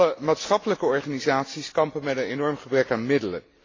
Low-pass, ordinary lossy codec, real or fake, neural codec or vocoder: 7.2 kHz; AAC, 32 kbps; real; none